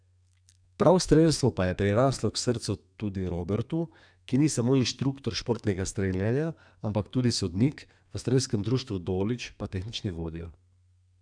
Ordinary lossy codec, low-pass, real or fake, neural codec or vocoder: none; 9.9 kHz; fake; codec, 32 kHz, 1.9 kbps, SNAC